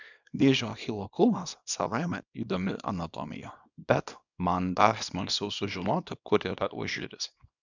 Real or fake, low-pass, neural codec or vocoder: fake; 7.2 kHz; codec, 24 kHz, 0.9 kbps, WavTokenizer, small release